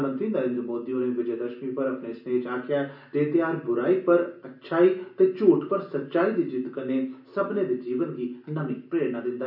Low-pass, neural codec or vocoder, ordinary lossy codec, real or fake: 5.4 kHz; none; none; real